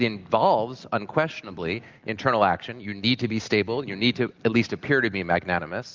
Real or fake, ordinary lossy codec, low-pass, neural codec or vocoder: real; Opus, 32 kbps; 7.2 kHz; none